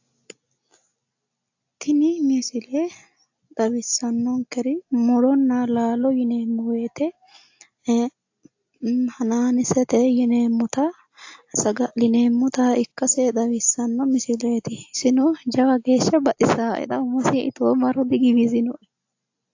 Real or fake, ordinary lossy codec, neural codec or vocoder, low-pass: real; AAC, 48 kbps; none; 7.2 kHz